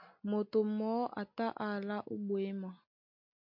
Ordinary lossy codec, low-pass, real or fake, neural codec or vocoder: MP3, 48 kbps; 5.4 kHz; real; none